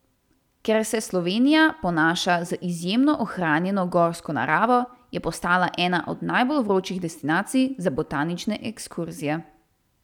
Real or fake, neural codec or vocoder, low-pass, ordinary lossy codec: real; none; 19.8 kHz; none